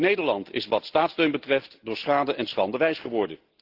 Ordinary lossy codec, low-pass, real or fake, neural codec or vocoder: Opus, 16 kbps; 5.4 kHz; real; none